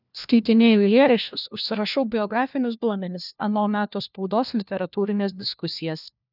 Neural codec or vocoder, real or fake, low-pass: codec, 16 kHz, 1 kbps, FunCodec, trained on LibriTTS, 50 frames a second; fake; 5.4 kHz